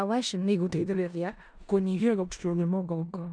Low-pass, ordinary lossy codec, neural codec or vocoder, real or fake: 9.9 kHz; none; codec, 16 kHz in and 24 kHz out, 0.4 kbps, LongCat-Audio-Codec, four codebook decoder; fake